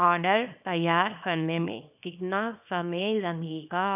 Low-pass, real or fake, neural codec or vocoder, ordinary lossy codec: 3.6 kHz; fake; codec, 24 kHz, 0.9 kbps, WavTokenizer, small release; none